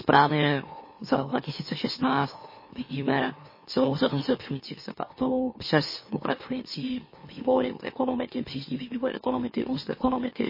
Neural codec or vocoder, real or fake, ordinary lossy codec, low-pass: autoencoder, 44.1 kHz, a latent of 192 numbers a frame, MeloTTS; fake; MP3, 24 kbps; 5.4 kHz